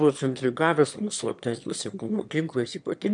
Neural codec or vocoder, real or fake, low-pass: autoencoder, 22.05 kHz, a latent of 192 numbers a frame, VITS, trained on one speaker; fake; 9.9 kHz